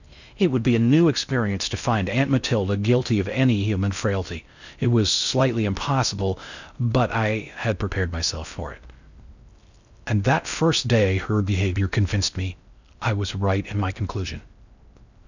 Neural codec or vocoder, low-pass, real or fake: codec, 16 kHz in and 24 kHz out, 0.6 kbps, FocalCodec, streaming, 4096 codes; 7.2 kHz; fake